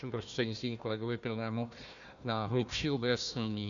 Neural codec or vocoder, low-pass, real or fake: codec, 16 kHz, 1 kbps, FunCodec, trained on Chinese and English, 50 frames a second; 7.2 kHz; fake